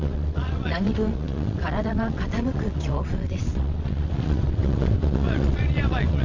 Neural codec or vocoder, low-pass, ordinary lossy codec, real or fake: vocoder, 22.05 kHz, 80 mel bands, WaveNeXt; 7.2 kHz; AAC, 48 kbps; fake